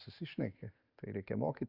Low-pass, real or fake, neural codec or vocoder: 5.4 kHz; real; none